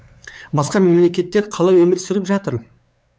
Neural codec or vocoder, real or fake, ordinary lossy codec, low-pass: codec, 16 kHz, 4 kbps, X-Codec, WavLM features, trained on Multilingual LibriSpeech; fake; none; none